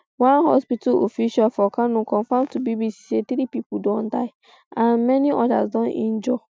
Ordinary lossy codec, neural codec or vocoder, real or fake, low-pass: none; none; real; none